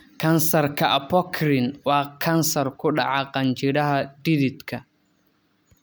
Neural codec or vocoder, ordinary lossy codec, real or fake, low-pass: none; none; real; none